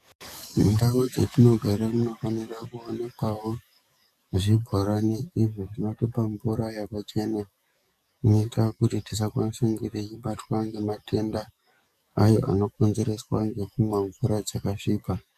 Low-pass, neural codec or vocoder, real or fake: 14.4 kHz; codec, 44.1 kHz, 7.8 kbps, DAC; fake